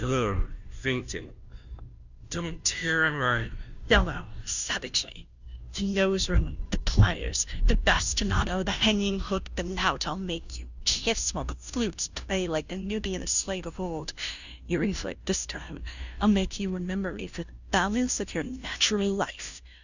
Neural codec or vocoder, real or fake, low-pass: codec, 16 kHz, 0.5 kbps, FunCodec, trained on Chinese and English, 25 frames a second; fake; 7.2 kHz